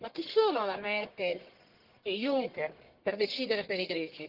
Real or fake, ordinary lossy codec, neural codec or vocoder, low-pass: fake; Opus, 16 kbps; codec, 44.1 kHz, 1.7 kbps, Pupu-Codec; 5.4 kHz